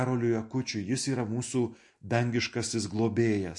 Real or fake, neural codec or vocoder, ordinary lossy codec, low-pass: real; none; MP3, 48 kbps; 10.8 kHz